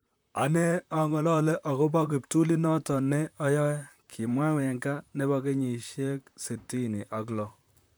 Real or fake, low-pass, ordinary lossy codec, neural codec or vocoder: fake; none; none; vocoder, 44.1 kHz, 128 mel bands, Pupu-Vocoder